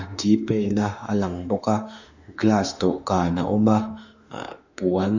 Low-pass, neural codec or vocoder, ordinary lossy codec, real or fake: 7.2 kHz; autoencoder, 48 kHz, 32 numbers a frame, DAC-VAE, trained on Japanese speech; none; fake